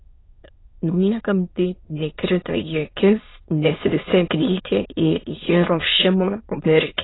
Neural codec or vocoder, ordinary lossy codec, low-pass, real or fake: autoencoder, 22.05 kHz, a latent of 192 numbers a frame, VITS, trained on many speakers; AAC, 16 kbps; 7.2 kHz; fake